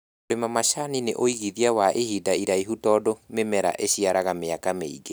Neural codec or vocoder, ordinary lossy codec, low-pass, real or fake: none; none; none; real